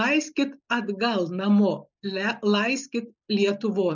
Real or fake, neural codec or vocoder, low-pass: real; none; 7.2 kHz